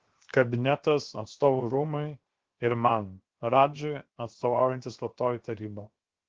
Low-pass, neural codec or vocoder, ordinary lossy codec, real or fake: 7.2 kHz; codec, 16 kHz, 0.7 kbps, FocalCodec; Opus, 16 kbps; fake